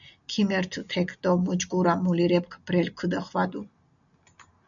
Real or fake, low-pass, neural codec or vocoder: real; 7.2 kHz; none